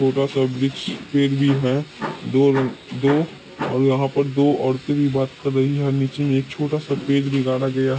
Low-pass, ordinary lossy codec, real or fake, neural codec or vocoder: none; none; real; none